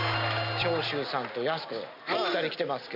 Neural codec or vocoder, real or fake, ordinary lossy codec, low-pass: none; real; none; 5.4 kHz